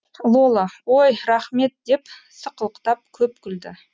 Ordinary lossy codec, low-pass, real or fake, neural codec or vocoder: none; none; real; none